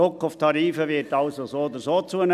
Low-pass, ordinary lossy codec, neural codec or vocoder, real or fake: 14.4 kHz; none; none; real